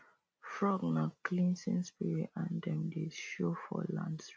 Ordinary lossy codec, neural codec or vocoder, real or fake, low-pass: none; none; real; none